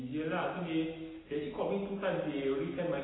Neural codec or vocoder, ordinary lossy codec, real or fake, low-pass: none; AAC, 16 kbps; real; 7.2 kHz